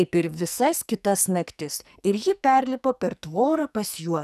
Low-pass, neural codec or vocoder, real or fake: 14.4 kHz; codec, 44.1 kHz, 2.6 kbps, SNAC; fake